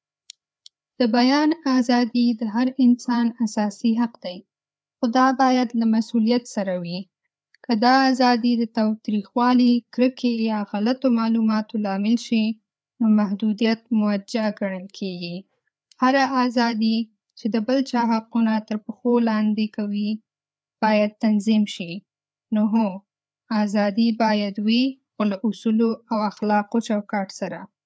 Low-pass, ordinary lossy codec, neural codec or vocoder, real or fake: none; none; codec, 16 kHz, 4 kbps, FreqCodec, larger model; fake